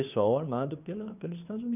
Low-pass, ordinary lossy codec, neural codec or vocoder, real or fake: 3.6 kHz; none; codec, 16 kHz, 2 kbps, FunCodec, trained on Chinese and English, 25 frames a second; fake